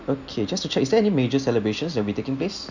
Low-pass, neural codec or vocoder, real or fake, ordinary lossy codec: 7.2 kHz; none; real; none